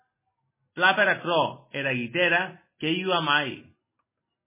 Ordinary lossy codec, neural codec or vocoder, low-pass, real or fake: MP3, 16 kbps; none; 3.6 kHz; real